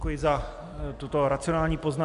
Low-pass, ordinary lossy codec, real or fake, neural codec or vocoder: 10.8 kHz; MP3, 96 kbps; real; none